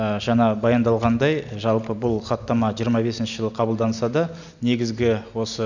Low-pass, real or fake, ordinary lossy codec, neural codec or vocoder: 7.2 kHz; real; none; none